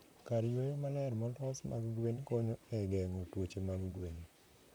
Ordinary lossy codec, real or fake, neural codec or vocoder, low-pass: none; fake; vocoder, 44.1 kHz, 128 mel bands, Pupu-Vocoder; none